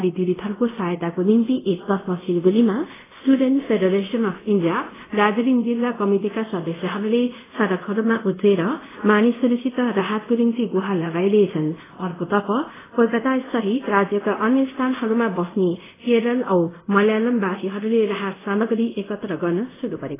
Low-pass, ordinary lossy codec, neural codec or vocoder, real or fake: 3.6 kHz; AAC, 16 kbps; codec, 24 kHz, 0.5 kbps, DualCodec; fake